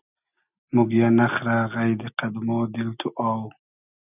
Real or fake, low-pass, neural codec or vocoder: real; 3.6 kHz; none